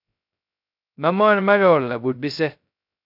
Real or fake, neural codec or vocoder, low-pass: fake; codec, 16 kHz, 0.2 kbps, FocalCodec; 5.4 kHz